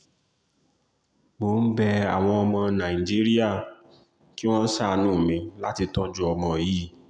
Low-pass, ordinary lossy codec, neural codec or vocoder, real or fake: 9.9 kHz; none; none; real